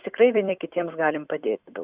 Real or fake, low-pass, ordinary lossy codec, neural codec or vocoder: fake; 3.6 kHz; Opus, 64 kbps; vocoder, 44.1 kHz, 128 mel bands every 256 samples, BigVGAN v2